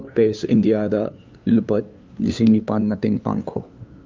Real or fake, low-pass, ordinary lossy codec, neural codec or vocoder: fake; 7.2 kHz; Opus, 24 kbps; codec, 16 kHz, 2 kbps, FunCodec, trained on LibriTTS, 25 frames a second